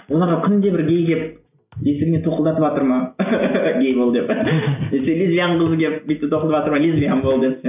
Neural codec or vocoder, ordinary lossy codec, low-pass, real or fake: none; none; 3.6 kHz; real